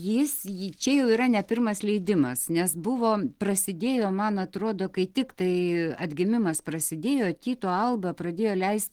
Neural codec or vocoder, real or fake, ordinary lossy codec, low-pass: none; real; Opus, 16 kbps; 19.8 kHz